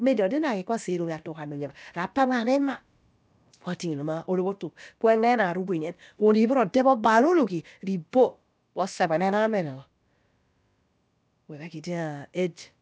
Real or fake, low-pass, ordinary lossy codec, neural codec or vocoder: fake; none; none; codec, 16 kHz, about 1 kbps, DyCAST, with the encoder's durations